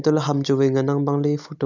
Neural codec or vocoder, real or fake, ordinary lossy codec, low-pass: none; real; none; 7.2 kHz